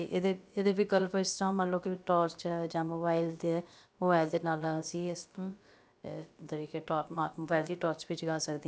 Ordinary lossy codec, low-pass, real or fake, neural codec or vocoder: none; none; fake; codec, 16 kHz, about 1 kbps, DyCAST, with the encoder's durations